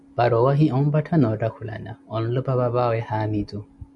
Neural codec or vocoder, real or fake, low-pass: none; real; 10.8 kHz